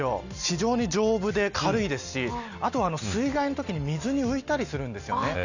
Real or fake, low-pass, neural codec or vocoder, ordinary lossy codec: real; 7.2 kHz; none; none